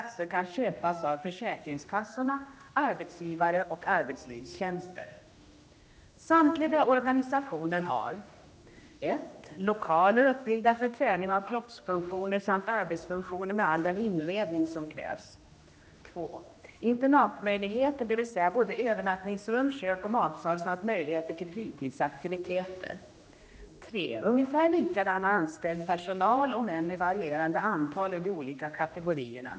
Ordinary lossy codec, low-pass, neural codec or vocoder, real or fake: none; none; codec, 16 kHz, 1 kbps, X-Codec, HuBERT features, trained on general audio; fake